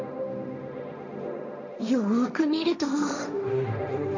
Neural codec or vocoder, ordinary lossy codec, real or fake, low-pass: codec, 16 kHz, 1.1 kbps, Voila-Tokenizer; none; fake; 7.2 kHz